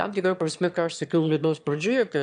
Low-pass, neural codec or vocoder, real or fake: 9.9 kHz; autoencoder, 22.05 kHz, a latent of 192 numbers a frame, VITS, trained on one speaker; fake